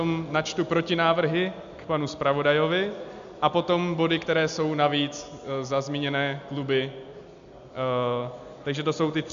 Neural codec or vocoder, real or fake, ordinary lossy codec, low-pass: none; real; MP3, 64 kbps; 7.2 kHz